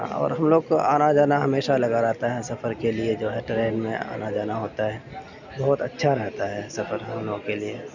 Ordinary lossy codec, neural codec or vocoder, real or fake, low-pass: none; none; real; 7.2 kHz